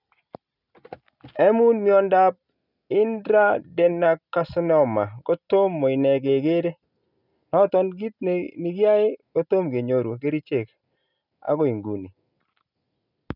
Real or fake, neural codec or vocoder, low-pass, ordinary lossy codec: real; none; 5.4 kHz; none